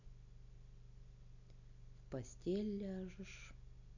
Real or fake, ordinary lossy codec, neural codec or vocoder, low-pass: real; none; none; 7.2 kHz